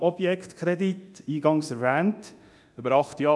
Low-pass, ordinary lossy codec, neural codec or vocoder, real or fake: none; none; codec, 24 kHz, 0.9 kbps, DualCodec; fake